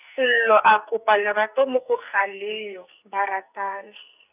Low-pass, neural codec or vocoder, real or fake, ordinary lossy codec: 3.6 kHz; codec, 44.1 kHz, 2.6 kbps, SNAC; fake; none